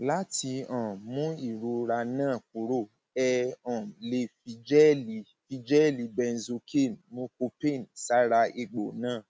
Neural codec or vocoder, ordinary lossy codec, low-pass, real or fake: none; none; none; real